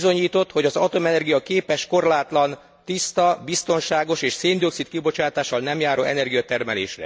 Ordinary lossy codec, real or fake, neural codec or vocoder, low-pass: none; real; none; none